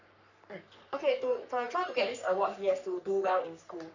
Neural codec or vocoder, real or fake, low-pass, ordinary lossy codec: codec, 44.1 kHz, 3.4 kbps, Pupu-Codec; fake; 7.2 kHz; none